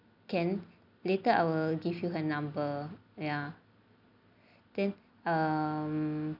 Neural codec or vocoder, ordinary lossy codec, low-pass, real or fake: none; none; 5.4 kHz; real